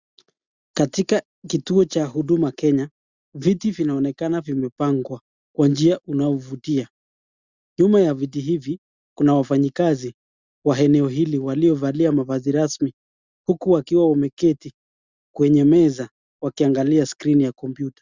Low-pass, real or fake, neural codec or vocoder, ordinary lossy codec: 7.2 kHz; real; none; Opus, 64 kbps